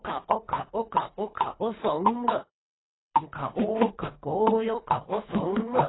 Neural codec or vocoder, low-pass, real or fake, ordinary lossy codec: codec, 24 kHz, 3 kbps, HILCodec; 7.2 kHz; fake; AAC, 16 kbps